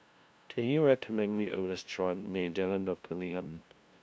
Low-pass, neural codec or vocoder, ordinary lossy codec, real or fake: none; codec, 16 kHz, 0.5 kbps, FunCodec, trained on LibriTTS, 25 frames a second; none; fake